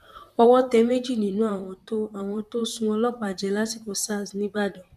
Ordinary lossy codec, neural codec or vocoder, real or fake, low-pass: none; vocoder, 44.1 kHz, 128 mel bands, Pupu-Vocoder; fake; 14.4 kHz